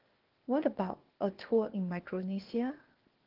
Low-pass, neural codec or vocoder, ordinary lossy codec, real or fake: 5.4 kHz; codec, 16 kHz, 0.8 kbps, ZipCodec; Opus, 16 kbps; fake